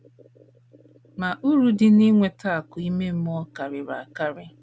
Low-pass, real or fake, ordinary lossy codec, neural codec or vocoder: none; real; none; none